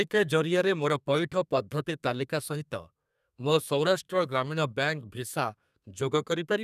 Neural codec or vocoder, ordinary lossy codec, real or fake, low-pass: codec, 32 kHz, 1.9 kbps, SNAC; none; fake; 14.4 kHz